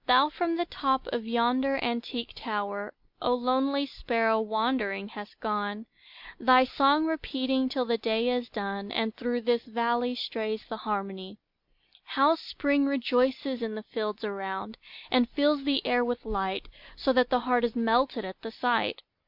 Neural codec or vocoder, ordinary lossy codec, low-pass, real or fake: none; MP3, 48 kbps; 5.4 kHz; real